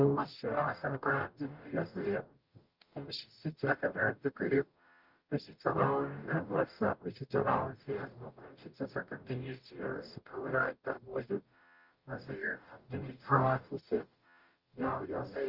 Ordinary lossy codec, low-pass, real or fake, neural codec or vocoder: Opus, 24 kbps; 5.4 kHz; fake; codec, 44.1 kHz, 0.9 kbps, DAC